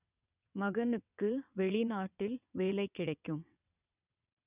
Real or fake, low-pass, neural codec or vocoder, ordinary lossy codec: fake; 3.6 kHz; vocoder, 22.05 kHz, 80 mel bands, WaveNeXt; none